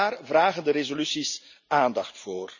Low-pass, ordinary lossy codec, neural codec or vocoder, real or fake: 7.2 kHz; none; none; real